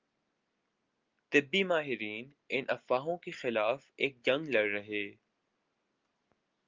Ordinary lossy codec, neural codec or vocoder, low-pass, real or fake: Opus, 32 kbps; none; 7.2 kHz; real